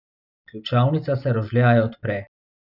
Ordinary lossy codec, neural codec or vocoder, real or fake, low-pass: none; none; real; 5.4 kHz